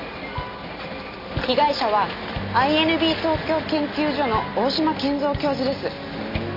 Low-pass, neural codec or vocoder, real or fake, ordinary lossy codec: 5.4 kHz; none; real; MP3, 48 kbps